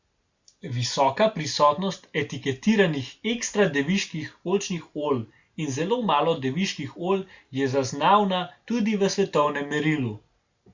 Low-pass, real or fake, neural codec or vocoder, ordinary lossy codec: 7.2 kHz; real; none; Opus, 64 kbps